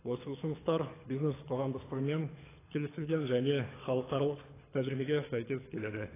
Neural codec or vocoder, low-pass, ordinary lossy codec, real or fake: codec, 24 kHz, 6 kbps, HILCodec; 3.6 kHz; AAC, 16 kbps; fake